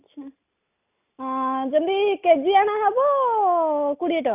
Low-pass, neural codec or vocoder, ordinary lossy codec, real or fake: 3.6 kHz; none; none; real